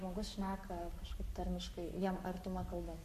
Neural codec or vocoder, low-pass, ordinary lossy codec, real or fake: codec, 44.1 kHz, 7.8 kbps, Pupu-Codec; 14.4 kHz; MP3, 64 kbps; fake